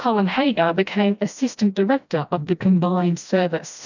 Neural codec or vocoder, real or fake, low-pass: codec, 16 kHz, 1 kbps, FreqCodec, smaller model; fake; 7.2 kHz